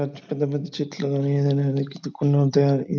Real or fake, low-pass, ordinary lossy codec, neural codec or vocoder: real; none; none; none